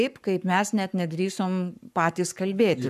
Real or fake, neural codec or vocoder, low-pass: fake; codec, 44.1 kHz, 7.8 kbps, Pupu-Codec; 14.4 kHz